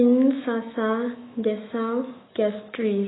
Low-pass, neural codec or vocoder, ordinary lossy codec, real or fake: 7.2 kHz; autoencoder, 48 kHz, 128 numbers a frame, DAC-VAE, trained on Japanese speech; AAC, 16 kbps; fake